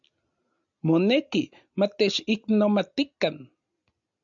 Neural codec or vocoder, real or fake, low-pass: none; real; 7.2 kHz